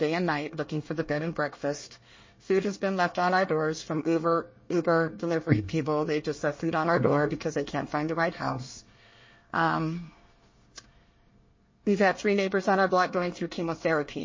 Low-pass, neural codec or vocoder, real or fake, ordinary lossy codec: 7.2 kHz; codec, 24 kHz, 1 kbps, SNAC; fake; MP3, 32 kbps